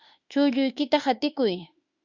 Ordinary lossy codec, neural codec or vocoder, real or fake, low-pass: Opus, 64 kbps; autoencoder, 48 kHz, 32 numbers a frame, DAC-VAE, trained on Japanese speech; fake; 7.2 kHz